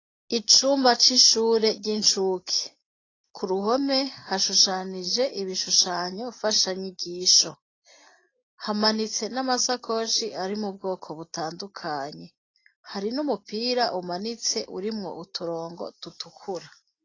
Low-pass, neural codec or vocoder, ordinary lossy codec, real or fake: 7.2 kHz; none; AAC, 32 kbps; real